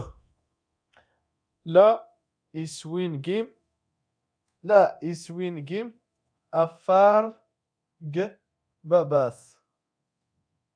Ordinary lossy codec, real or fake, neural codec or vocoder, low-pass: AAC, 64 kbps; fake; codec, 24 kHz, 0.9 kbps, DualCodec; 9.9 kHz